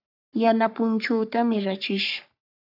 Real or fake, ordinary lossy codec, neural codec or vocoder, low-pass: fake; AAC, 48 kbps; codec, 44.1 kHz, 3.4 kbps, Pupu-Codec; 5.4 kHz